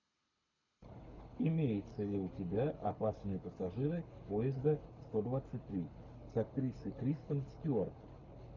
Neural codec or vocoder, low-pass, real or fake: codec, 24 kHz, 6 kbps, HILCodec; 7.2 kHz; fake